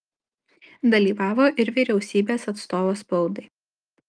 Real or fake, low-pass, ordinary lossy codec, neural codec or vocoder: real; 9.9 kHz; Opus, 32 kbps; none